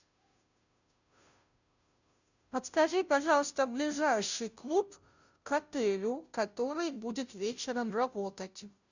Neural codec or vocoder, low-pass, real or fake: codec, 16 kHz, 0.5 kbps, FunCodec, trained on Chinese and English, 25 frames a second; 7.2 kHz; fake